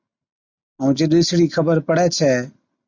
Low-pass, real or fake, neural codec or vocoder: 7.2 kHz; real; none